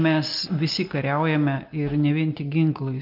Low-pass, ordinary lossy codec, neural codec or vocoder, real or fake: 5.4 kHz; Opus, 24 kbps; none; real